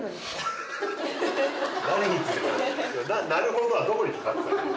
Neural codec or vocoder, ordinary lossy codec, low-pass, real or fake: none; none; none; real